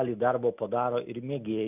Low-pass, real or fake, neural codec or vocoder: 3.6 kHz; real; none